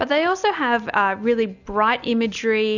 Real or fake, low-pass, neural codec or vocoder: real; 7.2 kHz; none